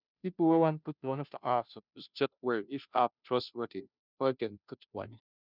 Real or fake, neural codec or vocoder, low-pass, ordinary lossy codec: fake; codec, 16 kHz, 0.5 kbps, FunCodec, trained on Chinese and English, 25 frames a second; 5.4 kHz; none